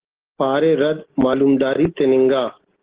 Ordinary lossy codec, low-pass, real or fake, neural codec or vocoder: Opus, 16 kbps; 3.6 kHz; real; none